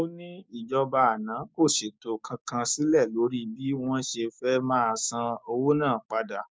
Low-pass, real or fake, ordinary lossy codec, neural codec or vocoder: none; fake; none; codec, 16 kHz, 6 kbps, DAC